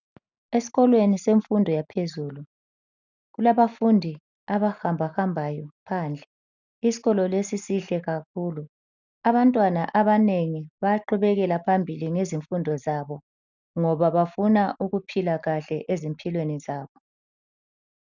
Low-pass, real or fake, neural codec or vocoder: 7.2 kHz; real; none